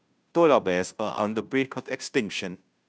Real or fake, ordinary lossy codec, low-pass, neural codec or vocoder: fake; none; none; codec, 16 kHz, 0.5 kbps, FunCodec, trained on Chinese and English, 25 frames a second